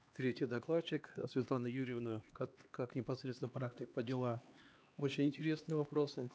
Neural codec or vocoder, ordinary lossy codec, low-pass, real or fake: codec, 16 kHz, 2 kbps, X-Codec, HuBERT features, trained on LibriSpeech; none; none; fake